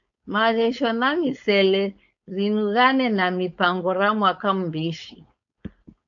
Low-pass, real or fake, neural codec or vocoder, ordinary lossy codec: 7.2 kHz; fake; codec, 16 kHz, 4.8 kbps, FACodec; AAC, 64 kbps